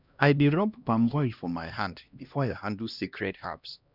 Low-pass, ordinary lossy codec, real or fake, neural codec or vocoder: 5.4 kHz; none; fake; codec, 16 kHz, 1 kbps, X-Codec, HuBERT features, trained on LibriSpeech